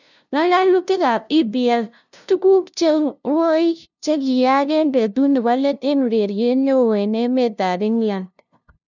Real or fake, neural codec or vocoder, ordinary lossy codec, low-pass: fake; codec, 16 kHz, 0.5 kbps, FunCodec, trained on LibriTTS, 25 frames a second; none; 7.2 kHz